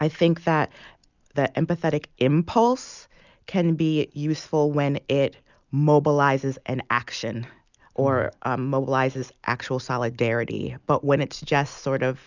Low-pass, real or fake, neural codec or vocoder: 7.2 kHz; real; none